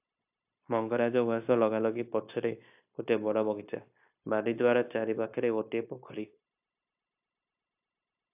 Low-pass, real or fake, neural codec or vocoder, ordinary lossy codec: 3.6 kHz; fake; codec, 16 kHz, 0.9 kbps, LongCat-Audio-Codec; AAC, 32 kbps